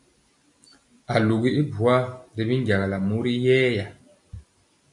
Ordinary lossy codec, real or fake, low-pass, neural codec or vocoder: AAC, 64 kbps; real; 10.8 kHz; none